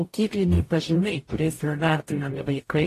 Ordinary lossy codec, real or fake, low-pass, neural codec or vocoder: AAC, 48 kbps; fake; 14.4 kHz; codec, 44.1 kHz, 0.9 kbps, DAC